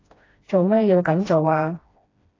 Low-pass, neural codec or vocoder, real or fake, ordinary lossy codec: 7.2 kHz; codec, 16 kHz, 1 kbps, FreqCodec, smaller model; fake; AAC, 32 kbps